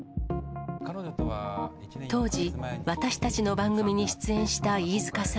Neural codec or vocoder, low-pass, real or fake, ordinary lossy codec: none; none; real; none